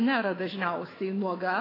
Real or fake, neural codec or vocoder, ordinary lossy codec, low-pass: fake; autoencoder, 48 kHz, 128 numbers a frame, DAC-VAE, trained on Japanese speech; AAC, 24 kbps; 5.4 kHz